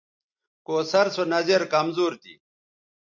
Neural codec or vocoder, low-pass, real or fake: none; 7.2 kHz; real